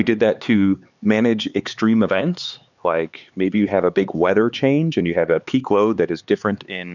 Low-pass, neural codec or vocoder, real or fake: 7.2 kHz; codec, 16 kHz, 4 kbps, X-Codec, HuBERT features, trained on LibriSpeech; fake